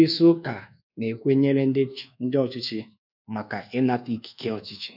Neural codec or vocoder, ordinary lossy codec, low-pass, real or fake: codec, 24 kHz, 1.2 kbps, DualCodec; none; 5.4 kHz; fake